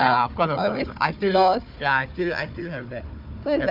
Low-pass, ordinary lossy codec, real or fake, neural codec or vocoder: 5.4 kHz; AAC, 48 kbps; fake; codec, 16 kHz, 4 kbps, FunCodec, trained on Chinese and English, 50 frames a second